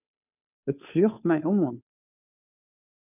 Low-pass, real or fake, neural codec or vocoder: 3.6 kHz; fake; codec, 16 kHz, 2 kbps, FunCodec, trained on Chinese and English, 25 frames a second